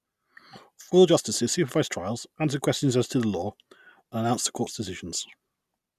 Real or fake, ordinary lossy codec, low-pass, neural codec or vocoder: real; none; 14.4 kHz; none